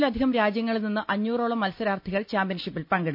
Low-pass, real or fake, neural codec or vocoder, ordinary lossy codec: 5.4 kHz; real; none; MP3, 48 kbps